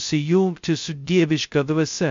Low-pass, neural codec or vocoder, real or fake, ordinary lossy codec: 7.2 kHz; codec, 16 kHz, 0.2 kbps, FocalCodec; fake; MP3, 48 kbps